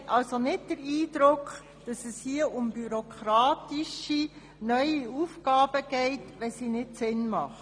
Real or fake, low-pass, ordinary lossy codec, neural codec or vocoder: real; 9.9 kHz; MP3, 96 kbps; none